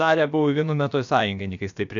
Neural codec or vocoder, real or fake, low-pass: codec, 16 kHz, about 1 kbps, DyCAST, with the encoder's durations; fake; 7.2 kHz